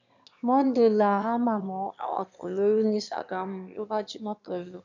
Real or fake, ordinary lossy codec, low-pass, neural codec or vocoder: fake; none; 7.2 kHz; autoencoder, 22.05 kHz, a latent of 192 numbers a frame, VITS, trained on one speaker